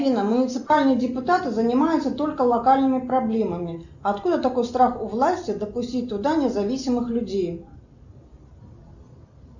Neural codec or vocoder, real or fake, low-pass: none; real; 7.2 kHz